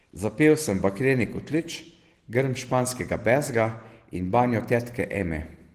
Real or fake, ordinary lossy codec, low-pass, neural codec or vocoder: real; Opus, 16 kbps; 14.4 kHz; none